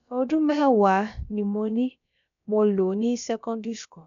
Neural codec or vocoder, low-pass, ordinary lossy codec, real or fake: codec, 16 kHz, about 1 kbps, DyCAST, with the encoder's durations; 7.2 kHz; none; fake